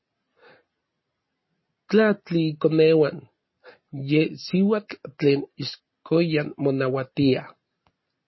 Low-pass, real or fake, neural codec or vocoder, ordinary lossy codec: 7.2 kHz; real; none; MP3, 24 kbps